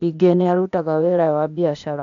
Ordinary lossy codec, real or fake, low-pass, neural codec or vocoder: none; fake; 7.2 kHz; codec, 16 kHz, 0.8 kbps, ZipCodec